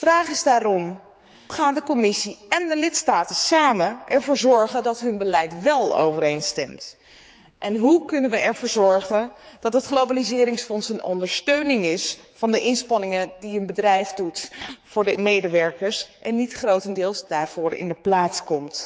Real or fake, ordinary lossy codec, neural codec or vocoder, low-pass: fake; none; codec, 16 kHz, 4 kbps, X-Codec, HuBERT features, trained on general audio; none